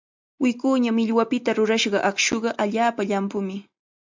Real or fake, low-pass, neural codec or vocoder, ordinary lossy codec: real; 7.2 kHz; none; MP3, 64 kbps